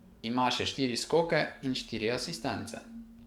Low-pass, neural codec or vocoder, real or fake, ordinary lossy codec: 19.8 kHz; codec, 44.1 kHz, 7.8 kbps, DAC; fake; Opus, 64 kbps